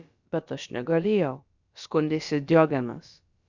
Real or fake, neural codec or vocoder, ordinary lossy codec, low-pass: fake; codec, 16 kHz, about 1 kbps, DyCAST, with the encoder's durations; Opus, 64 kbps; 7.2 kHz